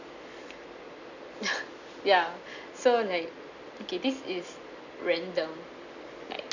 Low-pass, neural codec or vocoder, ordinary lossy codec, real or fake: 7.2 kHz; none; none; real